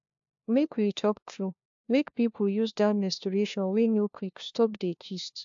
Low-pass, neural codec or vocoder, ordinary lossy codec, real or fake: 7.2 kHz; codec, 16 kHz, 1 kbps, FunCodec, trained on LibriTTS, 50 frames a second; AAC, 64 kbps; fake